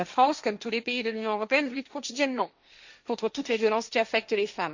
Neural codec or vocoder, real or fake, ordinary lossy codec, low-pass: codec, 16 kHz, 1.1 kbps, Voila-Tokenizer; fake; Opus, 64 kbps; 7.2 kHz